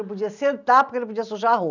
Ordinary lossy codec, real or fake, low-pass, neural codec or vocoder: none; real; 7.2 kHz; none